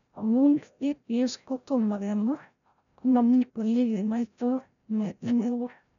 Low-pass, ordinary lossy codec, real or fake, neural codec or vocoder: 7.2 kHz; none; fake; codec, 16 kHz, 0.5 kbps, FreqCodec, larger model